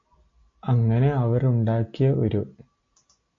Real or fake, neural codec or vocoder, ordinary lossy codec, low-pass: real; none; AAC, 48 kbps; 7.2 kHz